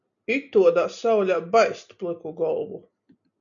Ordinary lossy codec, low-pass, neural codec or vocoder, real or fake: MP3, 96 kbps; 7.2 kHz; none; real